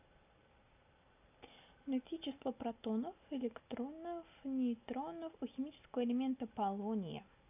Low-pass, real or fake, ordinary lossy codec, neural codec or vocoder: 3.6 kHz; real; AAC, 32 kbps; none